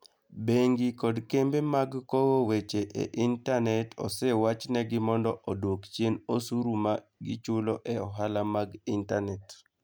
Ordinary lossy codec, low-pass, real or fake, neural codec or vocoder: none; none; real; none